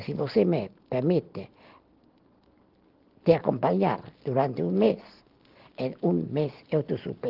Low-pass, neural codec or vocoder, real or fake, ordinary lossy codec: 5.4 kHz; none; real; Opus, 16 kbps